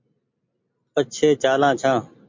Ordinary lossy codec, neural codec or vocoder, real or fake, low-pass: MP3, 48 kbps; none; real; 7.2 kHz